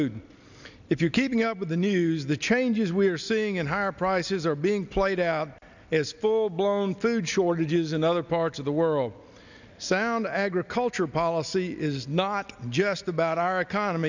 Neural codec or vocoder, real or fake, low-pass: none; real; 7.2 kHz